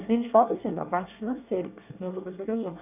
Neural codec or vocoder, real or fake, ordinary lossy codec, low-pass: codec, 24 kHz, 1 kbps, SNAC; fake; none; 3.6 kHz